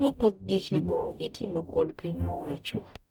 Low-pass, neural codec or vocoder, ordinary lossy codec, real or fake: 19.8 kHz; codec, 44.1 kHz, 0.9 kbps, DAC; none; fake